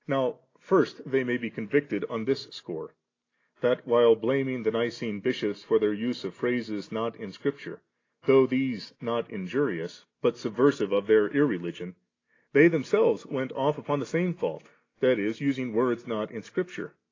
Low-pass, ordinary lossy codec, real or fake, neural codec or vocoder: 7.2 kHz; AAC, 32 kbps; real; none